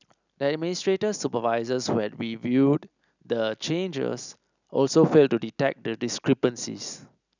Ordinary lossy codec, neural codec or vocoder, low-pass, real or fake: none; none; 7.2 kHz; real